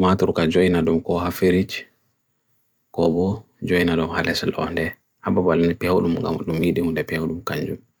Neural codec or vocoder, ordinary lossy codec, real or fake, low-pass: vocoder, 44.1 kHz, 128 mel bands every 256 samples, BigVGAN v2; none; fake; none